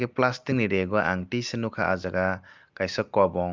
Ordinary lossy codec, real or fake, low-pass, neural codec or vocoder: Opus, 24 kbps; real; 7.2 kHz; none